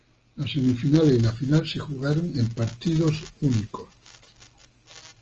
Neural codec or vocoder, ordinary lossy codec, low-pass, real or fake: none; Opus, 24 kbps; 7.2 kHz; real